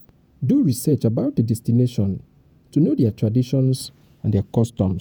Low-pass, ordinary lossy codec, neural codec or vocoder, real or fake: none; none; vocoder, 48 kHz, 128 mel bands, Vocos; fake